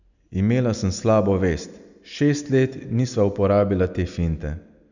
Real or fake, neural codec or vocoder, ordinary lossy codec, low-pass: real; none; none; 7.2 kHz